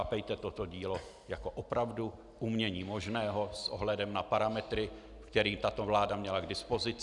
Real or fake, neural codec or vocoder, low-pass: real; none; 10.8 kHz